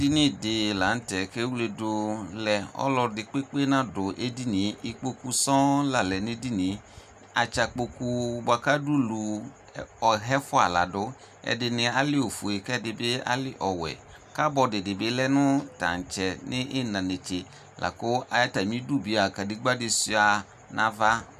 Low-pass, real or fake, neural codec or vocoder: 14.4 kHz; real; none